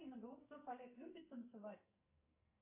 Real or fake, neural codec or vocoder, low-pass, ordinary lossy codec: fake; codec, 44.1 kHz, 2.6 kbps, SNAC; 3.6 kHz; AAC, 32 kbps